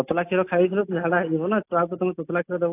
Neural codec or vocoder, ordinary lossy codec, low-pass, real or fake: none; AAC, 24 kbps; 3.6 kHz; real